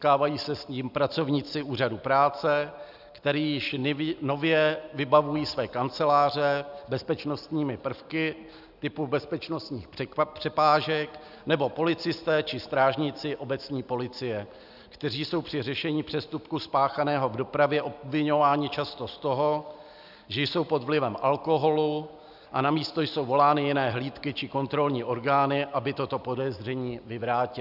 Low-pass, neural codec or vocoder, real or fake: 5.4 kHz; none; real